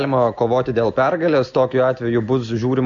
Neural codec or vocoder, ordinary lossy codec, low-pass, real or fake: none; MP3, 64 kbps; 7.2 kHz; real